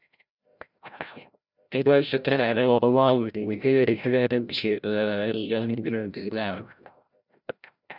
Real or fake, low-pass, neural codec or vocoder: fake; 5.4 kHz; codec, 16 kHz, 0.5 kbps, FreqCodec, larger model